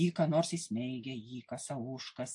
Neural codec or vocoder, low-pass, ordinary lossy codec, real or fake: none; 10.8 kHz; AAC, 64 kbps; real